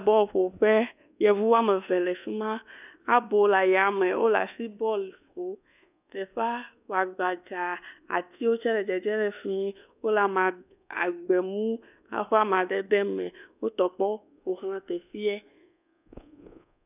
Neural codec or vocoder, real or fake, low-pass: codec, 24 kHz, 1.2 kbps, DualCodec; fake; 3.6 kHz